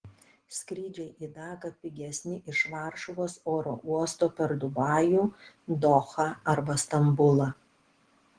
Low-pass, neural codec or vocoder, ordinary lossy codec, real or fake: 9.9 kHz; none; Opus, 16 kbps; real